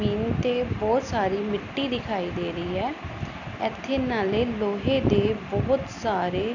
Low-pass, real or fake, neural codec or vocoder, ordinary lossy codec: 7.2 kHz; real; none; none